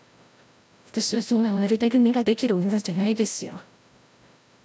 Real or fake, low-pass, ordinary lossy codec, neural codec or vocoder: fake; none; none; codec, 16 kHz, 0.5 kbps, FreqCodec, larger model